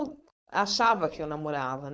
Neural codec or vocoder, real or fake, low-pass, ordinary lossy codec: codec, 16 kHz, 4.8 kbps, FACodec; fake; none; none